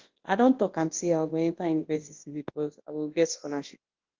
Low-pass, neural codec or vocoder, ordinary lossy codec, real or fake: 7.2 kHz; codec, 24 kHz, 0.9 kbps, WavTokenizer, large speech release; Opus, 16 kbps; fake